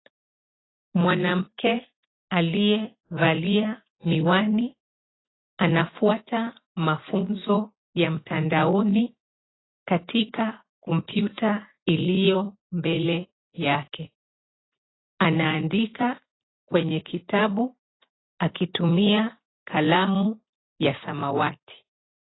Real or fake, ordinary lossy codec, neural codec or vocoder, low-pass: real; AAC, 16 kbps; none; 7.2 kHz